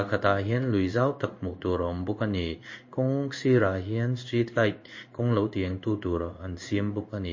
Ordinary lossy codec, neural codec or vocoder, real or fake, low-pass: MP3, 32 kbps; codec, 16 kHz in and 24 kHz out, 1 kbps, XY-Tokenizer; fake; 7.2 kHz